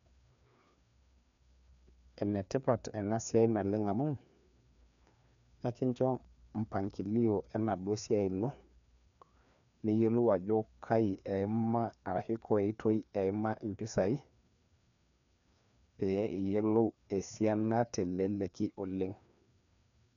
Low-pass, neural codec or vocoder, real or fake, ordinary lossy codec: 7.2 kHz; codec, 16 kHz, 2 kbps, FreqCodec, larger model; fake; none